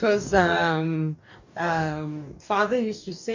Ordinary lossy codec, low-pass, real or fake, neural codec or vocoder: none; 7.2 kHz; fake; codec, 44.1 kHz, 2.6 kbps, DAC